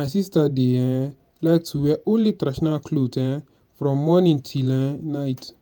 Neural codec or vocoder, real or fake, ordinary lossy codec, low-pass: vocoder, 48 kHz, 128 mel bands, Vocos; fake; none; none